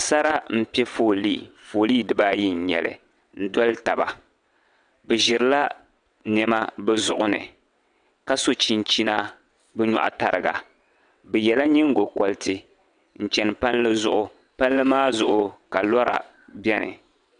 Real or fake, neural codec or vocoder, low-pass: fake; vocoder, 22.05 kHz, 80 mel bands, WaveNeXt; 9.9 kHz